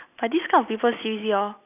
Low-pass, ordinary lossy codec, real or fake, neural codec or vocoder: 3.6 kHz; none; real; none